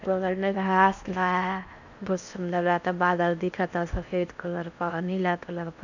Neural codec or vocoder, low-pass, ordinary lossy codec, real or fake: codec, 16 kHz in and 24 kHz out, 0.6 kbps, FocalCodec, streaming, 4096 codes; 7.2 kHz; none; fake